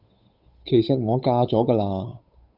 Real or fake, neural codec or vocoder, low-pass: fake; codec, 16 kHz, 8 kbps, FunCodec, trained on Chinese and English, 25 frames a second; 5.4 kHz